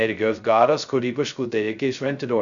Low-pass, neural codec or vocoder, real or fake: 7.2 kHz; codec, 16 kHz, 0.2 kbps, FocalCodec; fake